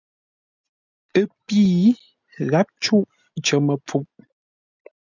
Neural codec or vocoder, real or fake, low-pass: none; real; 7.2 kHz